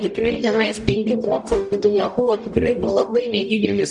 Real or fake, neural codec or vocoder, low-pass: fake; codec, 44.1 kHz, 0.9 kbps, DAC; 10.8 kHz